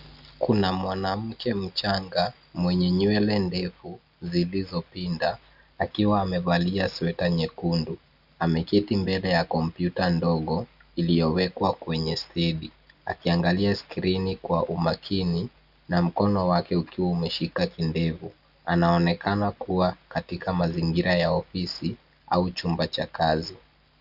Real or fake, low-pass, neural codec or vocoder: real; 5.4 kHz; none